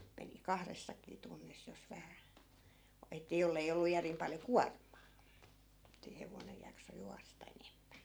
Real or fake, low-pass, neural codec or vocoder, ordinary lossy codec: fake; none; vocoder, 44.1 kHz, 128 mel bands every 512 samples, BigVGAN v2; none